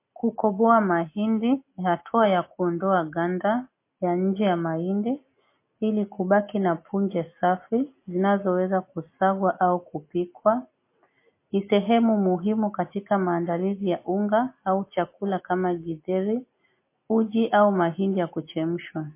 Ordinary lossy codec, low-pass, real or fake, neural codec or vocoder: MP3, 24 kbps; 3.6 kHz; real; none